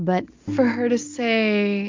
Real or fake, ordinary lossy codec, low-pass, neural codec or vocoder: real; MP3, 64 kbps; 7.2 kHz; none